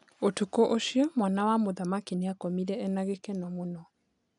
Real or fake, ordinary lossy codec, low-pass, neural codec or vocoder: real; none; 10.8 kHz; none